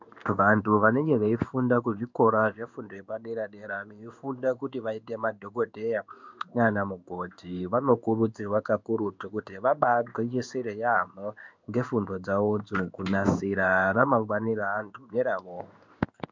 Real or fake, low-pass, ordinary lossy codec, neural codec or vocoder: fake; 7.2 kHz; MP3, 64 kbps; codec, 16 kHz in and 24 kHz out, 1 kbps, XY-Tokenizer